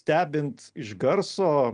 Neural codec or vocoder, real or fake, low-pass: none; real; 9.9 kHz